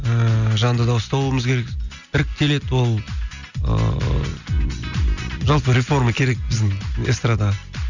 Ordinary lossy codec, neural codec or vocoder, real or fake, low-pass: none; none; real; 7.2 kHz